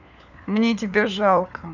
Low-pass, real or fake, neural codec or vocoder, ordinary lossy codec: 7.2 kHz; fake; codec, 16 kHz, 4 kbps, FunCodec, trained on LibriTTS, 50 frames a second; none